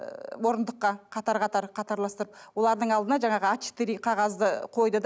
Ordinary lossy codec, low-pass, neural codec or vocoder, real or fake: none; none; none; real